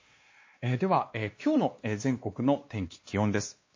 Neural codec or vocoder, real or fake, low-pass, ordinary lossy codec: codec, 16 kHz, 2 kbps, X-Codec, WavLM features, trained on Multilingual LibriSpeech; fake; 7.2 kHz; MP3, 32 kbps